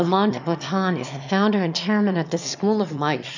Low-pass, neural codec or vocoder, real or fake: 7.2 kHz; autoencoder, 22.05 kHz, a latent of 192 numbers a frame, VITS, trained on one speaker; fake